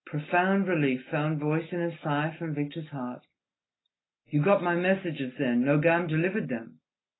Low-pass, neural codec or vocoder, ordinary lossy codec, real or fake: 7.2 kHz; none; AAC, 16 kbps; real